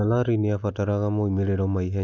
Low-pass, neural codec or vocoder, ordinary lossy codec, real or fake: none; none; none; real